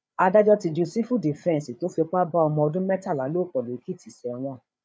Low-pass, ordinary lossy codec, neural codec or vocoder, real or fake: none; none; codec, 16 kHz, 8 kbps, FreqCodec, larger model; fake